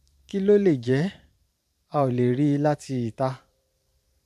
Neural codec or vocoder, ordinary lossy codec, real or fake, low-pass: vocoder, 44.1 kHz, 128 mel bands every 512 samples, BigVGAN v2; none; fake; 14.4 kHz